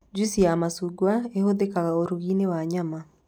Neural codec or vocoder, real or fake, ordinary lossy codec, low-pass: none; real; none; 19.8 kHz